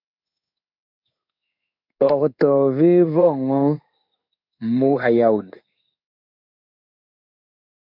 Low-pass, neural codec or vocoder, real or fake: 5.4 kHz; codec, 16 kHz in and 24 kHz out, 1 kbps, XY-Tokenizer; fake